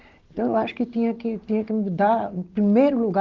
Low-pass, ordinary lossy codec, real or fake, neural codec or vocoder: 7.2 kHz; Opus, 16 kbps; real; none